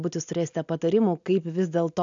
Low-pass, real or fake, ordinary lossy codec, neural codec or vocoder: 7.2 kHz; real; AAC, 64 kbps; none